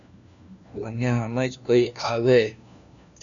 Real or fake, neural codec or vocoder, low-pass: fake; codec, 16 kHz, 1 kbps, FunCodec, trained on LibriTTS, 50 frames a second; 7.2 kHz